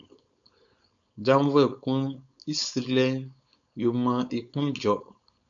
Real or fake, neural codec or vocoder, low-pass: fake; codec, 16 kHz, 4.8 kbps, FACodec; 7.2 kHz